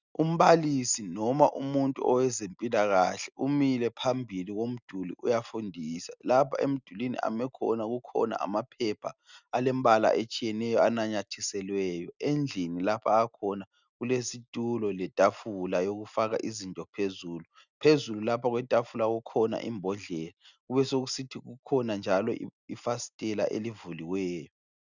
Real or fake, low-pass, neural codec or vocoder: real; 7.2 kHz; none